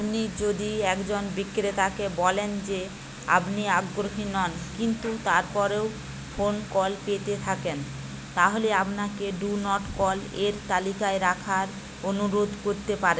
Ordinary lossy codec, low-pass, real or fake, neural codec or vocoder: none; none; real; none